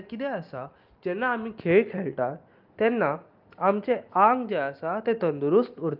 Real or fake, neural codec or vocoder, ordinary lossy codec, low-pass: real; none; Opus, 32 kbps; 5.4 kHz